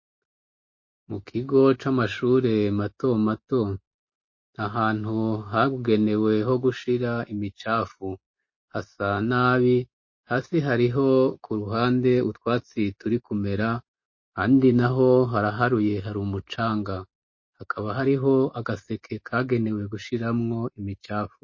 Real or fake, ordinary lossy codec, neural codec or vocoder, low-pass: real; MP3, 32 kbps; none; 7.2 kHz